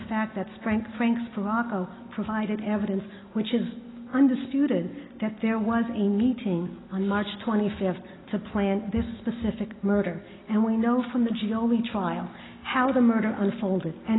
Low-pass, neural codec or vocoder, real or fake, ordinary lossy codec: 7.2 kHz; none; real; AAC, 16 kbps